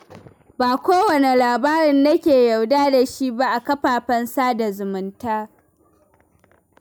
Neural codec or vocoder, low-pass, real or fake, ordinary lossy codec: none; none; real; none